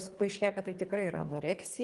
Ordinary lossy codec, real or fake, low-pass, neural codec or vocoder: Opus, 24 kbps; fake; 10.8 kHz; codec, 24 kHz, 3 kbps, HILCodec